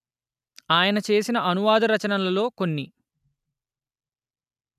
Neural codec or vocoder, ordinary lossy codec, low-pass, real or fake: none; none; 14.4 kHz; real